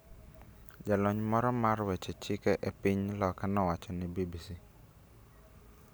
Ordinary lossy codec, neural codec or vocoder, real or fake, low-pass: none; none; real; none